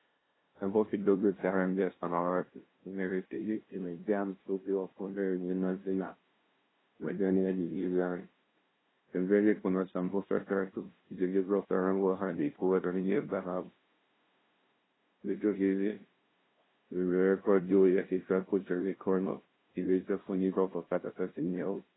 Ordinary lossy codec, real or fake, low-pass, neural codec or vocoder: AAC, 16 kbps; fake; 7.2 kHz; codec, 16 kHz, 0.5 kbps, FunCodec, trained on LibriTTS, 25 frames a second